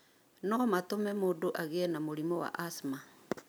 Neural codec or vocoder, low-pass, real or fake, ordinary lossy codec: none; none; real; none